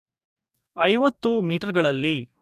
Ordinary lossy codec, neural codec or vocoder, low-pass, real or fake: none; codec, 44.1 kHz, 2.6 kbps, DAC; 14.4 kHz; fake